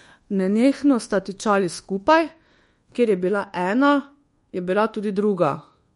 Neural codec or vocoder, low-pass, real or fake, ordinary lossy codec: codec, 24 kHz, 1.2 kbps, DualCodec; 10.8 kHz; fake; MP3, 48 kbps